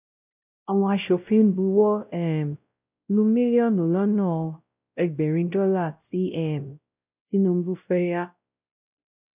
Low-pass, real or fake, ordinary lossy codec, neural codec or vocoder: 3.6 kHz; fake; none; codec, 16 kHz, 0.5 kbps, X-Codec, WavLM features, trained on Multilingual LibriSpeech